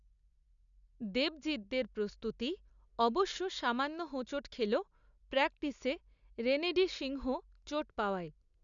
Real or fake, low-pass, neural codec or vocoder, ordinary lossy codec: real; 7.2 kHz; none; none